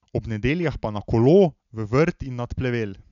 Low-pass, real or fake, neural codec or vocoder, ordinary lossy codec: 7.2 kHz; real; none; none